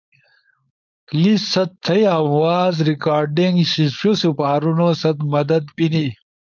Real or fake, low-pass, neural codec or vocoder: fake; 7.2 kHz; codec, 16 kHz, 4.8 kbps, FACodec